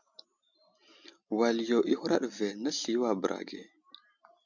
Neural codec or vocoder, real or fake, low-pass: none; real; 7.2 kHz